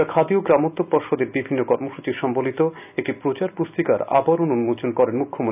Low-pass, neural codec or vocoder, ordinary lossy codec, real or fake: 3.6 kHz; none; none; real